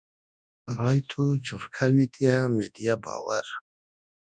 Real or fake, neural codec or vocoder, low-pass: fake; codec, 24 kHz, 0.9 kbps, WavTokenizer, large speech release; 9.9 kHz